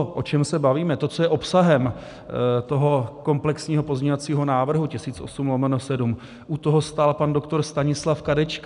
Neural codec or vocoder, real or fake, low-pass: none; real; 10.8 kHz